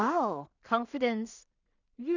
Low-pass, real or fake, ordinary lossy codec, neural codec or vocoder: 7.2 kHz; fake; none; codec, 16 kHz in and 24 kHz out, 0.4 kbps, LongCat-Audio-Codec, two codebook decoder